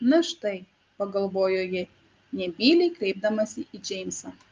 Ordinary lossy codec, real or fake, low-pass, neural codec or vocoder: Opus, 32 kbps; real; 7.2 kHz; none